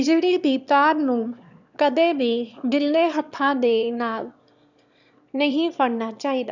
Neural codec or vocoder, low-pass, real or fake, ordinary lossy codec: autoencoder, 22.05 kHz, a latent of 192 numbers a frame, VITS, trained on one speaker; 7.2 kHz; fake; none